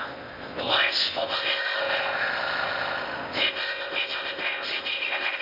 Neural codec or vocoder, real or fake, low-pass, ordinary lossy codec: codec, 16 kHz in and 24 kHz out, 0.6 kbps, FocalCodec, streaming, 4096 codes; fake; 5.4 kHz; none